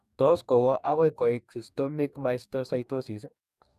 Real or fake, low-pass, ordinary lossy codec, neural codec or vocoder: fake; 14.4 kHz; none; codec, 44.1 kHz, 2.6 kbps, DAC